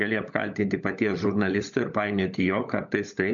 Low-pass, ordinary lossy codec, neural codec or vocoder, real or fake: 7.2 kHz; MP3, 64 kbps; codec, 16 kHz, 16 kbps, FunCodec, trained on LibriTTS, 50 frames a second; fake